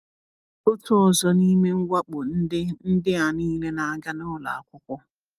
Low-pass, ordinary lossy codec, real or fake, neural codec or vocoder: 14.4 kHz; Opus, 32 kbps; real; none